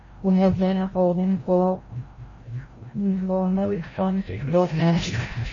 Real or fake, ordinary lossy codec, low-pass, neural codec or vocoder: fake; MP3, 32 kbps; 7.2 kHz; codec, 16 kHz, 0.5 kbps, FreqCodec, larger model